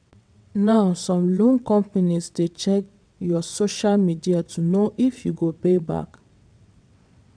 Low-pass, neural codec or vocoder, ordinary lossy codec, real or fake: 9.9 kHz; vocoder, 22.05 kHz, 80 mel bands, WaveNeXt; none; fake